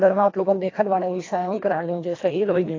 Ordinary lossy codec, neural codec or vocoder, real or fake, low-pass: AAC, 32 kbps; codec, 24 kHz, 1.5 kbps, HILCodec; fake; 7.2 kHz